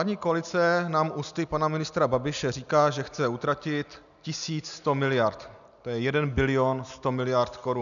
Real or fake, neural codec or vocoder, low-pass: real; none; 7.2 kHz